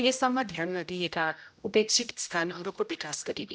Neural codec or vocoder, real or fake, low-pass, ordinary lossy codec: codec, 16 kHz, 0.5 kbps, X-Codec, HuBERT features, trained on general audio; fake; none; none